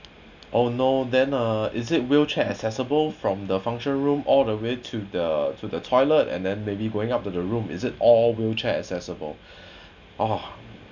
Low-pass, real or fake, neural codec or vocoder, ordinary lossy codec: 7.2 kHz; real; none; none